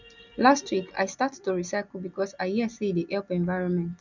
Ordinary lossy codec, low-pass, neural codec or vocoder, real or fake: none; 7.2 kHz; none; real